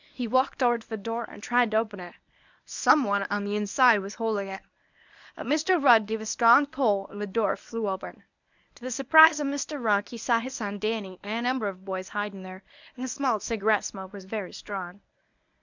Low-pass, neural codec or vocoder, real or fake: 7.2 kHz; codec, 24 kHz, 0.9 kbps, WavTokenizer, medium speech release version 1; fake